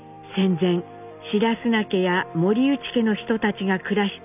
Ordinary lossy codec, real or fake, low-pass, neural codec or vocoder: none; real; 3.6 kHz; none